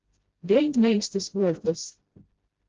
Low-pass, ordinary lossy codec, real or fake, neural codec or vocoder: 7.2 kHz; Opus, 16 kbps; fake; codec, 16 kHz, 0.5 kbps, FreqCodec, smaller model